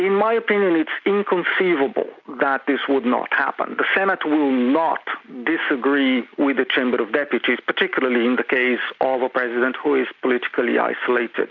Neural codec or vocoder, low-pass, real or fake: none; 7.2 kHz; real